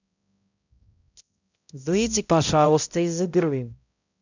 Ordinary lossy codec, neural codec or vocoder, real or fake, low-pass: none; codec, 16 kHz, 0.5 kbps, X-Codec, HuBERT features, trained on balanced general audio; fake; 7.2 kHz